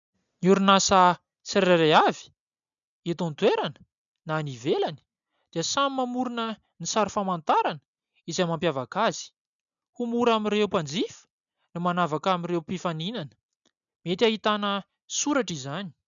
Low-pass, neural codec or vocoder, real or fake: 7.2 kHz; none; real